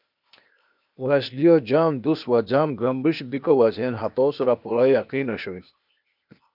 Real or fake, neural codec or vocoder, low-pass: fake; codec, 16 kHz, 0.8 kbps, ZipCodec; 5.4 kHz